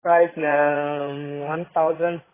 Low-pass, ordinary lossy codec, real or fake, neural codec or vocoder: 3.6 kHz; AAC, 16 kbps; fake; codec, 16 kHz in and 24 kHz out, 2.2 kbps, FireRedTTS-2 codec